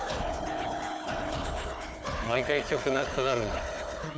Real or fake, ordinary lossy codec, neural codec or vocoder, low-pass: fake; none; codec, 16 kHz, 4 kbps, FunCodec, trained on Chinese and English, 50 frames a second; none